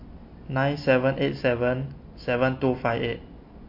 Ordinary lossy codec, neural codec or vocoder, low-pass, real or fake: MP3, 32 kbps; none; 5.4 kHz; real